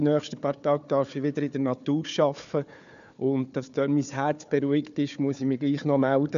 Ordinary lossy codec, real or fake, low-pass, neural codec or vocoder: none; fake; 7.2 kHz; codec, 16 kHz, 4 kbps, FunCodec, trained on Chinese and English, 50 frames a second